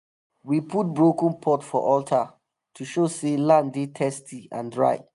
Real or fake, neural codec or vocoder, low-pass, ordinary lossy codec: real; none; 10.8 kHz; none